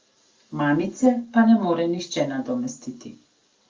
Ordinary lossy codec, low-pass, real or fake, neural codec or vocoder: Opus, 32 kbps; 7.2 kHz; real; none